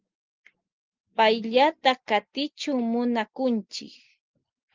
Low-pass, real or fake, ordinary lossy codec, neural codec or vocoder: 7.2 kHz; real; Opus, 24 kbps; none